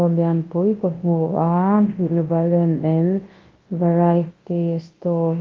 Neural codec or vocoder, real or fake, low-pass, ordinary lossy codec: codec, 24 kHz, 0.9 kbps, WavTokenizer, large speech release; fake; 7.2 kHz; Opus, 16 kbps